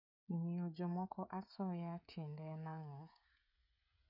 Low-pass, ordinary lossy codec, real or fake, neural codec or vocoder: 5.4 kHz; none; fake; codec, 16 kHz, 16 kbps, FreqCodec, smaller model